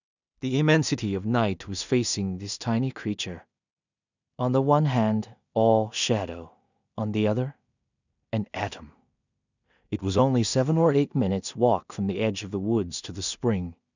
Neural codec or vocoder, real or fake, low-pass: codec, 16 kHz in and 24 kHz out, 0.4 kbps, LongCat-Audio-Codec, two codebook decoder; fake; 7.2 kHz